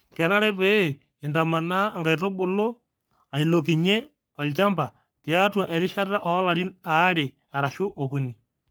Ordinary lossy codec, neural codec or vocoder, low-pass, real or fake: none; codec, 44.1 kHz, 3.4 kbps, Pupu-Codec; none; fake